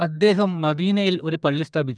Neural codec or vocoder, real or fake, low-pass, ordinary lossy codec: codec, 32 kHz, 1.9 kbps, SNAC; fake; 9.9 kHz; none